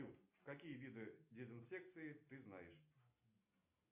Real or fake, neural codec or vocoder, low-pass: real; none; 3.6 kHz